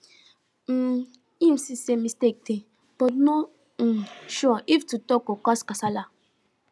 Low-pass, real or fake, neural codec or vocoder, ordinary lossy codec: none; real; none; none